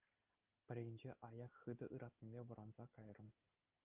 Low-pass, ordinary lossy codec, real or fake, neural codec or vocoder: 3.6 kHz; Opus, 24 kbps; real; none